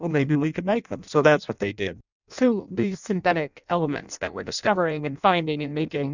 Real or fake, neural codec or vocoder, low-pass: fake; codec, 16 kHz in and 24 kHz out, 0.6 kbps, FireRedTTS-2 codec; 7.2 kHz